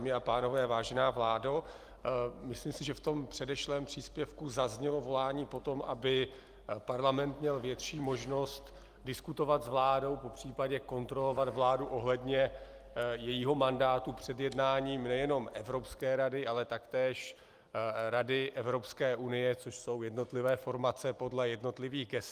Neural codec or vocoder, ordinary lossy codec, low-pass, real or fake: none; Opus, 32 kbps; 14.4 kHz; real